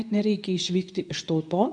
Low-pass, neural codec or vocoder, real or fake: 9.9 kHz; codec, 24 kHz, 0.9 kbps, WavTokenizer, medium speech release version 2; fake